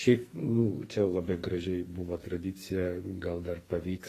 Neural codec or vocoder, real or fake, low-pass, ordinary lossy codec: codec, 44.1 kHz, 2.6 kbps, SNAC; fake; 14.4 kHz; AAC, 48 kbps